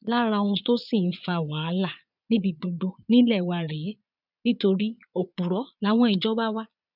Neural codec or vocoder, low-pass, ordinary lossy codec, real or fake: vocoder, 22.05 kHz, 80 mel bands, Vocos; 5.4 kHz; none; fake